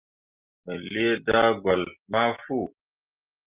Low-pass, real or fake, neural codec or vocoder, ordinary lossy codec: 3.6 kHz; real; none; Opus, 32 kbps